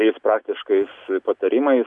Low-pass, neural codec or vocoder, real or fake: 10.8 kHz; none; real